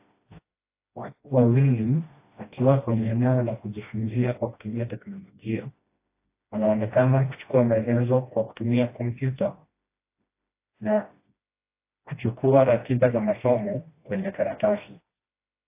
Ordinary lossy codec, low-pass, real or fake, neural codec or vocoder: AAC, 24 kbps; 3.6 kHz; fake; codec, 16 kHz, 1 kbps, FreqCodec, smaller model